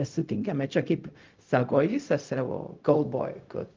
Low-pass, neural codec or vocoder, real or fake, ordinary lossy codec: 7.2 kHz; codec, 16 kHz, 0.4 kbps, LongCat-Audio-Codec; fake; Opus, 32 kbps